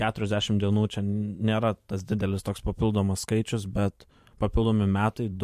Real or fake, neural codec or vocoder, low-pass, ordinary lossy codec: fake; vocoder, 44.1 kHz, 128 mel bands every 512 samples, BigVGAN v2; 14.4 kHz; MP3, 64 kbps